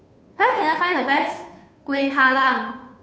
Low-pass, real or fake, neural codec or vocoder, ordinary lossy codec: none; fake; codec, 16 kHz, 2 kbps, FunCodec, trained on Chinese and English, 25 frames a second; none